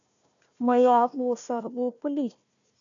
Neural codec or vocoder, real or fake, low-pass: codec, 16 kHz, 1 kbps, FunCodec, trained on Chinese and English, 50 frames a second; fake; 7.2 kHz